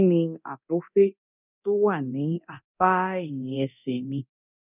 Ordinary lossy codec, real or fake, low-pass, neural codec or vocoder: none; fake; 3.6 kHz; codec, 24 kHz, 0.9 kbps, DualCodec